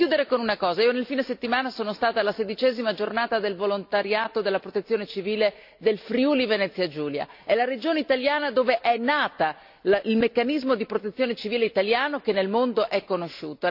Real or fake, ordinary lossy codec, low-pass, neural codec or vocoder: real; AAC, 48 kbps; 5.4 kHz; none